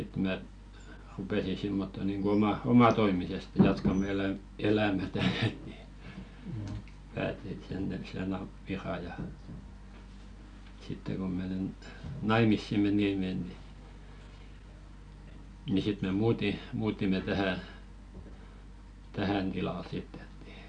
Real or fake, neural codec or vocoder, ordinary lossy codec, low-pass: real; none; none; 9.9 kHz